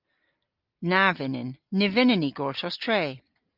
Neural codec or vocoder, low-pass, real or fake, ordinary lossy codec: none; 5.4 kHz; real; Opus, 32 kbps